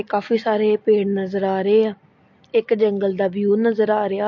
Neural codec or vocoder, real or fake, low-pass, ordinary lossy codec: none; real; 7.2 kHz; MP3, 48 kbps